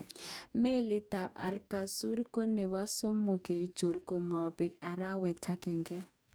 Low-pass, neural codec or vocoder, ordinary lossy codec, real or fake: none; codec, 44.1 kHz, 2.6 kbps, DAC; none; fake